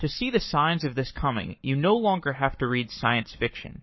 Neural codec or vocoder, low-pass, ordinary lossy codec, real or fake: codec, 44.1 kHz, 7.8 kbps, Pupu-Codec; 7.2 kHz; MP3, 24 kbps; fake